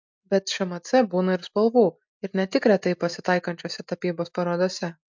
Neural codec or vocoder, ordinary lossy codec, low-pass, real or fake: none; MP3, 64 kbps; 7.2 kHz; real